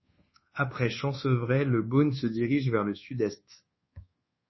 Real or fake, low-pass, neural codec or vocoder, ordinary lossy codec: fake; 7.2 kHz; codec, 24 kHz, 1.2 kbps, DualCodec; MP3, 24 kbps